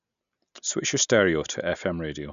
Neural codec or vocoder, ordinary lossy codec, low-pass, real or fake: none; none; 7.2 kHz; real